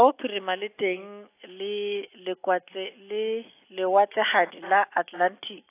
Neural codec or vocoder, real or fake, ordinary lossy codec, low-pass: none; real; AAC, 24 kbps; 3.6 kHz